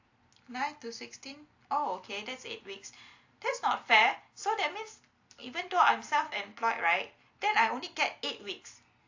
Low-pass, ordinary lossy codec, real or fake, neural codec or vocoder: 7.2 kHz; AAC, 48 kbps; real; none